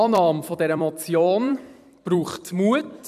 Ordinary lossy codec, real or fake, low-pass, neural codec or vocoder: none; fake; 14.4 kHz; vocoder, 44.1 kHz, 128 mel bands every 256 samples, BigVGAN v2